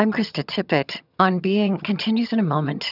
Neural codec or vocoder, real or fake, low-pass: vocoder, 22.05 kHz, 80 mel bands, HiFi-GAN; fake; 5.4 kHz